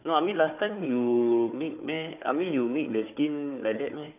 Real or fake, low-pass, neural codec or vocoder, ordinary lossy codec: fake; 3.6 kHz; codec, 16 kHz, 4 kbps, FunCodec, trained on Chinese and English, 50 frames a second; none